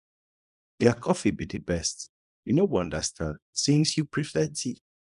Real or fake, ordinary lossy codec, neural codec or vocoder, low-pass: fake; none; codec, 24 kHz, 0.9 kbps, WavTokenizer, small release; 10.8 kHz